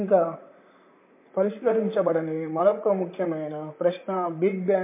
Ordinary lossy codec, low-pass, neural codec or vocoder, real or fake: MP3, 24 kbps; 3.6 kHz; vocoder, 44.1 kHz, 128 mel bands, Pupu-Vocoder; fake